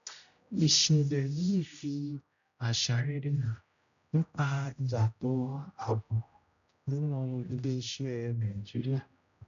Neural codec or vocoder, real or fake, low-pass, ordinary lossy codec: codec, 16 kHz, 0.5 kbps, X-Codec, HuBERT features, trained on general audio; fake; 7.2 kHz; none